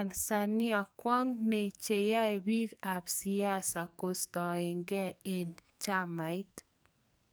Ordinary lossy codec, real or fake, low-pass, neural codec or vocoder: none; fake; none; codec, 44.1 kHz, 2.6 kbps, SNAC